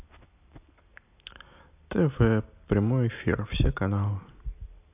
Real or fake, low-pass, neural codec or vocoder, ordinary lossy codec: real; 3.6 kHz; none; AAC, 32 kbps